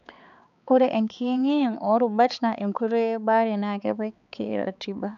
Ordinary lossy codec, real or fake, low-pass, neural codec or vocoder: none; fake; 7.2 kHz; codec, 16 kHz, 4 kbps, X-Codec, HuBERT features, trained on balanced general audio